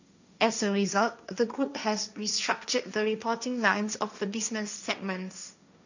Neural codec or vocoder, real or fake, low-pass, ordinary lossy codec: codec, 16 kHz, 1.1 kbps, Voila-Tokenizer; fake; 7.2 kHz; none